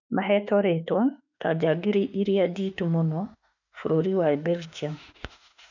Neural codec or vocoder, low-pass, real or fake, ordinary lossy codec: autoencoder, 48 kHz, 32 numbers a frame, DAC-VAE, trained on Japanese speech; 7.2 kHz; fake; none